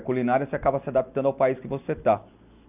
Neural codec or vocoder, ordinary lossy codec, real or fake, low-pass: none; none; real; 3.6 kHz